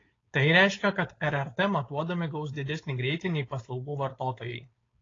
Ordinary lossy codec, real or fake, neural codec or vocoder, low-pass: AAC, 32 kbps; fake; codec, 16 kHz, 4.8 kbps, FACodec; 7.2 kHz